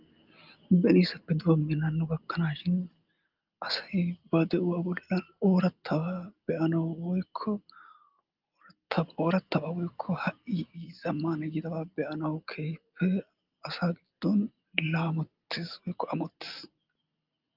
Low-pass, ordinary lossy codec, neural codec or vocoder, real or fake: 5.4 kHz; Opus, 32 kbps; none; real